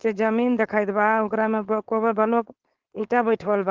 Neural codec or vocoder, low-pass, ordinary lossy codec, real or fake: codec, 16 kHz, 4.8 kbps, FACodec; 7.2 kHz; Opus, 16 kbps; fake